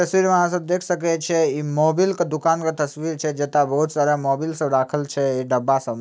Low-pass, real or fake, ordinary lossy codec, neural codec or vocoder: none; real; none; none